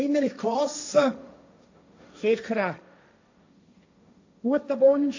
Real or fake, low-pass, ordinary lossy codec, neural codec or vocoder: fake; 7.2 kHz; AAC, 48 kbps; codec, 16 kHz, 1.1 kbps, Voila-Tokenizer